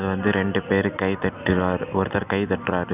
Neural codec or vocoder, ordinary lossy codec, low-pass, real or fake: none; none; 3.6 kHz; real